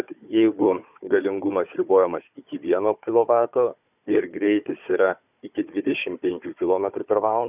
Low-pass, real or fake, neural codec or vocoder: 3.6 kHz; fake; codec, 16 kHz, 4 kbps, FunCodec, trained on Chinese and English, 50 frames a second